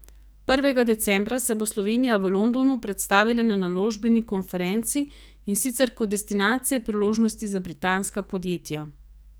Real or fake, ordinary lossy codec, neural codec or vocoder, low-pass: fake; none; codec, 44.1 kHz, 2.6 kbps, SNAC; none